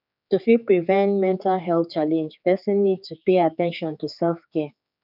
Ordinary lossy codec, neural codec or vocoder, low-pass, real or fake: none; codec, 16 kHz, 4 kbps, X-Codec, HuBERT features, trained on general audio; 5.4 kHz; fake